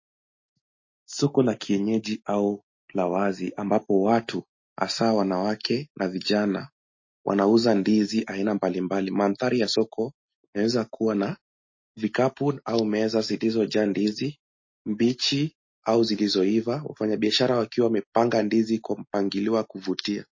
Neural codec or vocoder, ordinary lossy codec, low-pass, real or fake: none; MP3, 32 kbps; 7.2 kHz; real